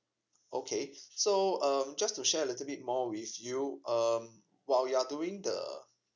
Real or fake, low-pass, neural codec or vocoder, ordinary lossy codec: real; 7.2 kHz; none; none